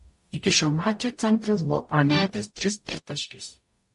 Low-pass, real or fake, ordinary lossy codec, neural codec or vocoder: 14.4 kHz; fake; MP3, 48 kbps; codec, 44.1 kHz, 0.9 kbps, DAC